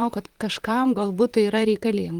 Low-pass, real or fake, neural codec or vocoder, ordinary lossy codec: 19.8 kHz; fake; vocoder, 44.1 kHz, 128 mel bands, Pupu-Vocoder; Opus, 24 kbps